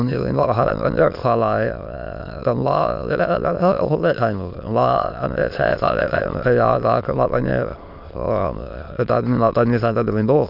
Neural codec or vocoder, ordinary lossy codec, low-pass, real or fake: autoencoder, 22.05 kHz, a latent of 192 numbers a frame, VITS, trained on many speakers; none; 5.4 kHz; fake